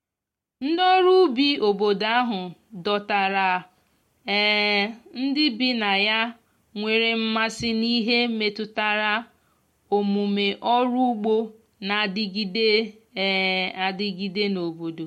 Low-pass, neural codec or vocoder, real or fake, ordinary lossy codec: 19.8 kHz; none; real; MP3, 64 kbps